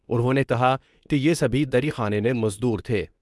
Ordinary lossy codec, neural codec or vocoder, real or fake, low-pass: none; codec, 24 kHz, 0.9 kbps, WavTokenizer, medium speech release version 2; fake; none